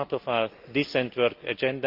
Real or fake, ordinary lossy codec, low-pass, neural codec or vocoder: real; Opus, 32 kbps; 5.4 kHz; none